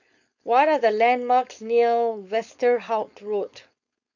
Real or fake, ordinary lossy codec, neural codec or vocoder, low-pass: fake; none; codec, 16 kHz, 4.8 kbps, FACodec; 7.2 kHz